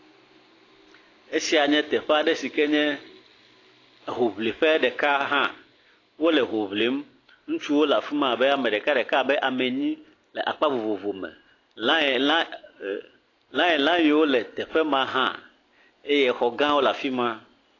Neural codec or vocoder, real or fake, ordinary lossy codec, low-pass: none; real; AAC, 32 kbps; 7.2 kHz